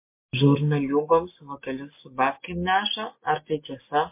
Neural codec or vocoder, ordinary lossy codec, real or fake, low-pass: none; MP3, 24 kbps; real; 3.6 kHz